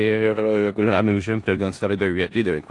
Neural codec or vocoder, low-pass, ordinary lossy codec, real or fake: codec, 16 kHz in and 24 kHz out, 0.4 kbps, LongCat-Audio-Codec, four codebook decoder; 10.8 kHz; AAC, 48 kbps; fake